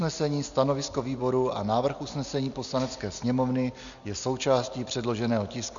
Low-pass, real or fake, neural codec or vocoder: 7.2 kHz; real; none